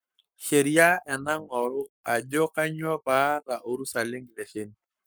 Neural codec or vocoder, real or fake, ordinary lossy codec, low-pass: codec, 44.1 kHz, 7.8 kbps, Pupu-Codec; fake; none; none